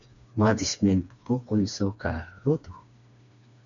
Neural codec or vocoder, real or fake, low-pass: codec, 16 kHz, 2 kbps, FreqCodec, smaller model; fake; 7.2 kHz